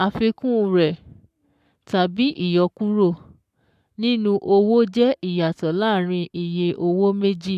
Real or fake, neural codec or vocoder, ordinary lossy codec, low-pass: fake; codec, 44.1 kHz, 7.8 kbps, Pupu-Codec; none; 14.4 kHz